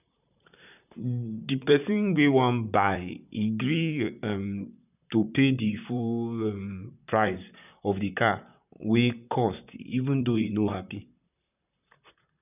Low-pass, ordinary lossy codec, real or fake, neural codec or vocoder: 3.6 kHz; none; fake; vocoder, 44.1 kHz, 128 mel bands, Pupu-Vocoder